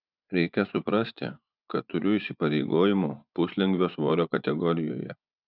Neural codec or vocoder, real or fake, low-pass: vocoder, 24 kHz, 100 mel bands, Vocos; fake; 5.4 kHz